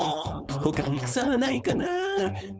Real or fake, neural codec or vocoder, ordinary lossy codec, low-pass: fake; codec, 16 kHz, 4.8 kbps, FACodec; none; none